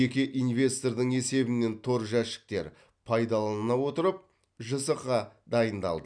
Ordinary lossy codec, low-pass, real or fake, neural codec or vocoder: none; 9.9 kHz; real; none